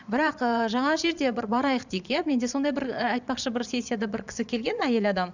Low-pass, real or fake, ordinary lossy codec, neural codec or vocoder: 7.2 kHz; fake; none; vocoder, 22.05 kHz, 80 mel bands, Vocos